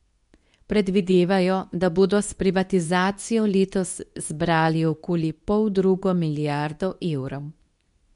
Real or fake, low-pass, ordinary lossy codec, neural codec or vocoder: fake; 10.8 kHz; none; codec, 24 kHz, 0.9 kbps, WavTokenizer, medium speech release version 2